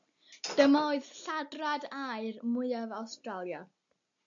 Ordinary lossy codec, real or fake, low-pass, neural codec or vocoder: AAC, 64 kbps; real; 7.2 kHz; none